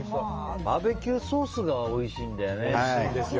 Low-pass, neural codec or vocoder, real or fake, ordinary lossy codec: 7.2 kHz; autoencoder, 48 kHz, 128 numbers a frame, DAC-VAE, trained on Japanese speech; fake; Opus, 24 kbps